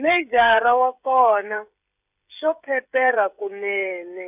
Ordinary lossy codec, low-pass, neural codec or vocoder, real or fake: none; 3.6 kHz; codec, 16 kHz, 16 kbps, FreqCodec, smaller model; fake